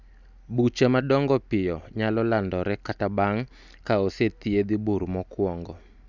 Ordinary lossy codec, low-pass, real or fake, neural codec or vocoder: none; 7.2 kHz; real; none